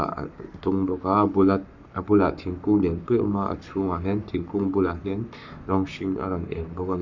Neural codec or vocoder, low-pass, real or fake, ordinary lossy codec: codec, 44.1 kHz, 7.8 kbps, Pupu-Codec; 7.2 kHz; fake; none